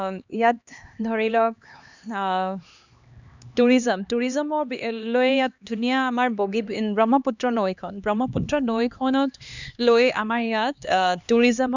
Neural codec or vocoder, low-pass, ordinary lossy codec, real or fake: codec, 16 kHz, 2 kbps, X-Codec, HuBERT features, trained on LibriSpeech; 7.2 kHz; none; fake